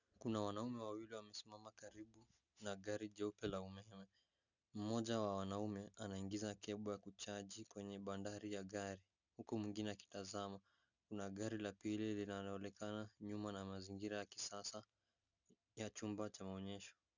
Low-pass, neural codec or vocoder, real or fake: 7.2 kHz; none; real